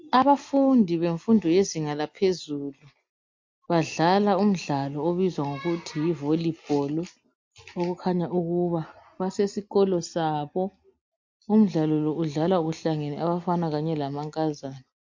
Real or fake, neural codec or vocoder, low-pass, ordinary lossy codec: real; none; 7.2 kHz; MP3, 48 kbps